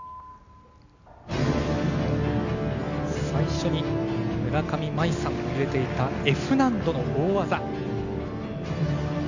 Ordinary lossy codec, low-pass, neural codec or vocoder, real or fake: none; 7.2 kHz; none; real